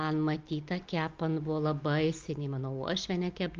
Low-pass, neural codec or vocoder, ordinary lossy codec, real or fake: 7.2 kHz; none; Opus, 24 kbps; real